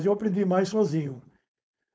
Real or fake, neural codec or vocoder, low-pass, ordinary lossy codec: fake; codec, 16 kHz, 4.8 kbps, FACodec; none; none